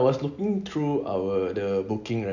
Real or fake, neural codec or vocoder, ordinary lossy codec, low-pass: real; none; none; 7.2 kHz